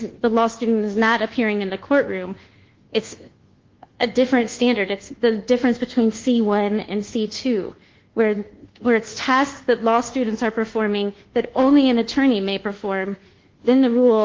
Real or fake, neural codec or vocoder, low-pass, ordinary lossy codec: fake; codec, 24 kHz, 1.2 kbps, DualCodec; 7.2 kHz; Opus, 16 kbps